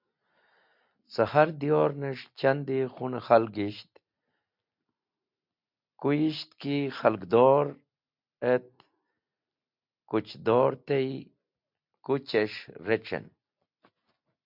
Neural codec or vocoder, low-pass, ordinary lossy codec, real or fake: none; 5.4 kHz; MP3, 48 kbps; real